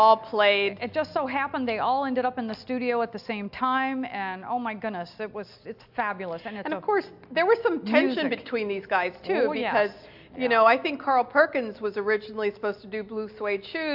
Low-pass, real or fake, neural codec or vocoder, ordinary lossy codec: 5.4 kHz; real; none; AAC, 48 kbps